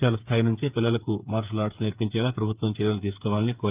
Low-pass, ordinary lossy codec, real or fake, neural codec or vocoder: 3.6 kHz; Opus, 32 kbps; fake; codec, 16 kHz, 8 kbps, FreqCodec, smaller model